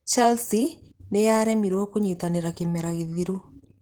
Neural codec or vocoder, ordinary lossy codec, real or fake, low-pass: vocoder, 44.1 kHz, 128 mel bands, Pupu-Vocoder; Opus, 16 kbps; fake; 19.8 kHz